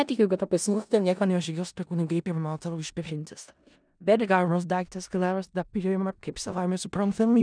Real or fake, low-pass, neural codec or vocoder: fake; 9.9 kHz; codec, 16 kHz in and 24 kHz out, 0.4 kbps, LongCat-Audio-Codec, four codebook decoder